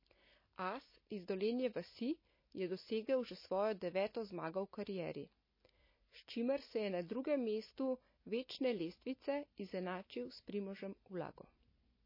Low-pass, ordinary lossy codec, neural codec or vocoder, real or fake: 5.4 kHz; MP3, 24 kbps; none; real